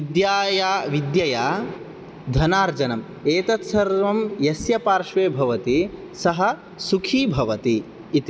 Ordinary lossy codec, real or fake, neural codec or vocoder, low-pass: none; real; none; none